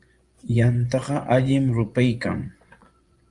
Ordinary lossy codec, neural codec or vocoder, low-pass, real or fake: Opus, 32 kbps; vocoder, 24 kHz, 100 mel bands, Vocos; 10.8 kHz; fake